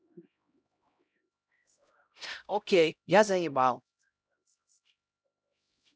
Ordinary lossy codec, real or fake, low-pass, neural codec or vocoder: none; fake; none; codec, 16 kHz, 0.5 kbps, X-Codec, HuBERT features, trained on LibriSpeech